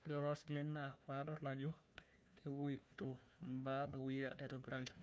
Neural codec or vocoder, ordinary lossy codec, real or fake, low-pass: codec, 16 kHz, 1 kbps, FunCodec, trained on Chinese and English, 50 frames a second; none; fake; none